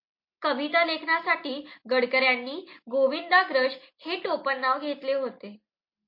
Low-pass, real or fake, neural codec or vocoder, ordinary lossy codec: 5.4 kHz; real; none; MP3, 32 kbps